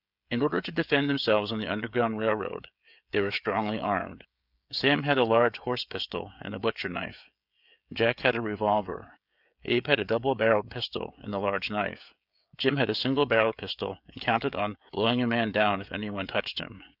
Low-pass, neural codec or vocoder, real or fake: 5.4 kHz; codec, 16 kHz, 16 kbps, FreqCodec, smaller model; fake